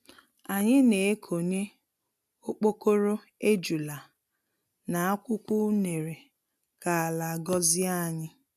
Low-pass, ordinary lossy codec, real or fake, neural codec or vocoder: 14.4 kHz; none; real; none